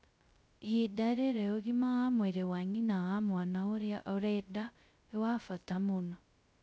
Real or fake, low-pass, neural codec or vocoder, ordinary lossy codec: fake; none; codec, 16 kHz, 0.2 kbps, FocalCodec; none